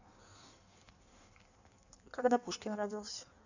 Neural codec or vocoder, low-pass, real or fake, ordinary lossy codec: codec, 16 kHz in and 24 kHz out, 1.1 kbps, FireRedTTS-2 codec; 7.2 kHz; fake; none